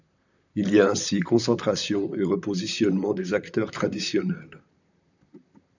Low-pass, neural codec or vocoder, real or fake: 7.2 kHz; vocoder, 44.1 kHz, 128 mel bands, Pupu-Vocoder; fake